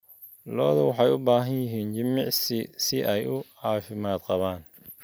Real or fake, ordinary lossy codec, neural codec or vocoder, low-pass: real; none; none; none